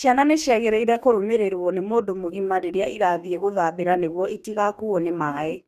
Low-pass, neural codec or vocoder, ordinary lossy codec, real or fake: 14.4 kHz; codec, 44.1 kHz, 2.6 kbps, DAC; none; fake